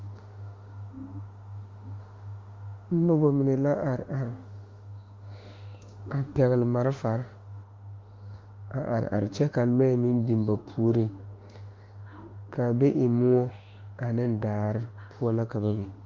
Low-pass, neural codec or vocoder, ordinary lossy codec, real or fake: 7.2 kHz; autoencoder, 48 kHz, 32 numbers a frame, DAC-VAE, trained on Japanese speech; Opus, 32 kbps; fake